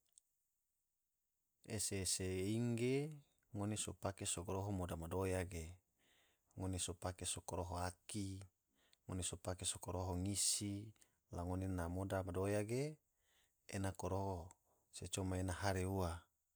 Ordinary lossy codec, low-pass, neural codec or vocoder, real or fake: none; none; none; real